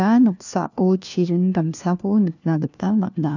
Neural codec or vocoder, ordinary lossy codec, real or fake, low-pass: codec, 16 kHz, 1 kbps, FunCodec, trained on LibriTTS, 50 frames a second; none; fake; 7.2 kHz